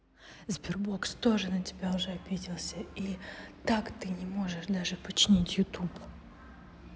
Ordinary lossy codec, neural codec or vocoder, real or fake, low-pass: none; none; real; none